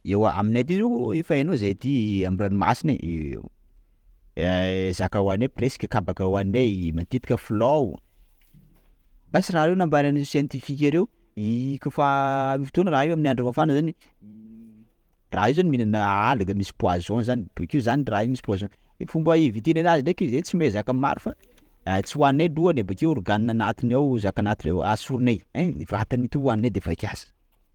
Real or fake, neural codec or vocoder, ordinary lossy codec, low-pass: fake; vocoder, 44.1 kHz, 128 mel bands every 512 samples, BigVGAN v2; Opus, 24 kbps; 19.8 kHz